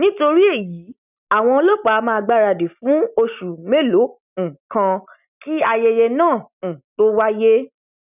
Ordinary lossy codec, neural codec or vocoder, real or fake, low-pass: none; none; real; 3.6 kHz